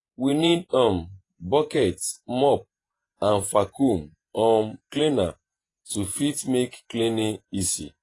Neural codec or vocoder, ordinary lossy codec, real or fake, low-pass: vocoder, 48 kHz, 128 mel bands, Vocos; AAC, 32 kbps; fake; 10.8 kHz